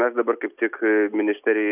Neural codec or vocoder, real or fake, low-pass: none; real; 3.6 kHz